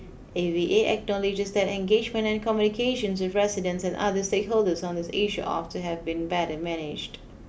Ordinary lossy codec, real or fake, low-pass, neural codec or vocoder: none; real; none; none